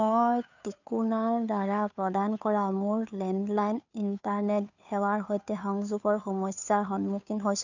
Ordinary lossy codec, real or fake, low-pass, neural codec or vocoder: none; fake; 7.2 kHz; codec, 16 kHz, 2 kbps, FunCodec, trained on Chinese and English, 25 frames a second